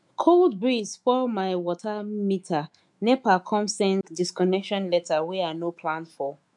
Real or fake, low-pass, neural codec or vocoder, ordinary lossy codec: fake; 10.8 kHz; autoencoder, 48 kHz, 128 numbers a frame, DAC-VAE, trained on Japanese speech; MP3, 64 kbps